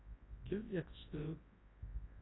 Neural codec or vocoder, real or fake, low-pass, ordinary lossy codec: codec, 24 kHz, 0.9 kbps, WavTokenizer, large speech release; fake; 7.2 kHz; AAC, 16 kbps